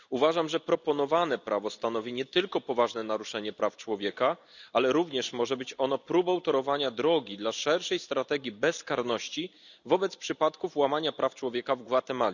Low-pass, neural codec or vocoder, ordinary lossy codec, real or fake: 7.2 kHz; none; none; real